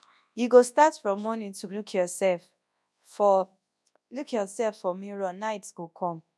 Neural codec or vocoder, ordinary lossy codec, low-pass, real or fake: codec, 24 kHz, 0.9 kbps, WavTokenizer, large speech release; none; none; fake